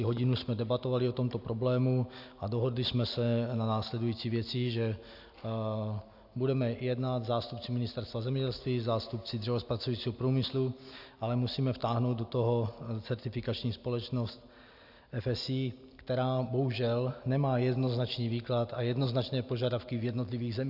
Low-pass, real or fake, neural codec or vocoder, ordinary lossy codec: 5.4 kHz; real; none; AAC, 48 kbps